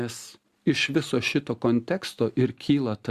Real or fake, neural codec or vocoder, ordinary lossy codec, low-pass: real; none; Opus, 64 kbps; 14.4 kHz